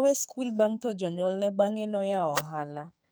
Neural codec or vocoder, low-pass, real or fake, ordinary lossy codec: codec, 44.1 kHz, 2.6 kbps, SNAC; none; fake; none